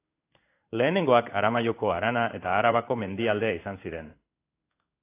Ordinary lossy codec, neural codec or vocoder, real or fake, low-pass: AAC, 24 kbps; codec, 16 kHz in and 24 kHz out, 1 kbps, XY-Tokenizer; fake; 3.6 kHz